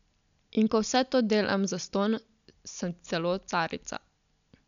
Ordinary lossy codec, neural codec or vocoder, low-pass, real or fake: none; none; 7.2 kHz; real